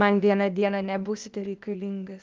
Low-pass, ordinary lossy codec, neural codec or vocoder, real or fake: 7.2 kHz; Opus, 32 kbps; codec, 16 kHz, 0.8 kbps, ZipCodec; fake